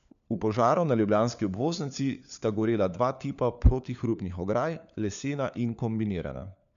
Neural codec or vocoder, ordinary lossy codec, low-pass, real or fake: codec, 16 kHz, 4 kbps, FunCodec, trained on LibriTTS, 50 frames a second; none; 7.2 kHz; fake